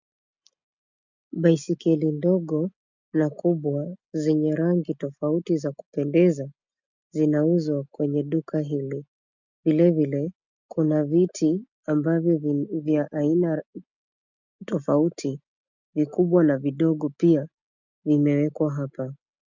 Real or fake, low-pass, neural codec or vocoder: real; 7.2 kHz; none